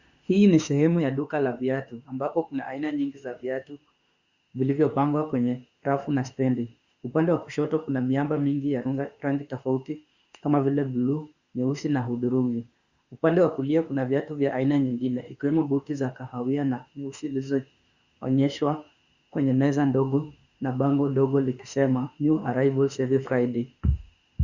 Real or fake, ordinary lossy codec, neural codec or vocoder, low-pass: fake; Opus, 64 kbps; autoencoder, 48 kHz, 32 numbers a frame, DAC-VAE, trained on Japanese speech; 7.2 kHz